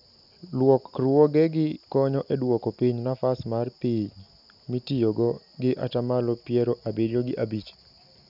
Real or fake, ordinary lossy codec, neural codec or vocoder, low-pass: real; none; none; 5.4 kHz